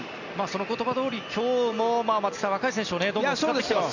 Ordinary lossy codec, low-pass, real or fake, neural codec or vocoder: none; 7.2 kHz; real; none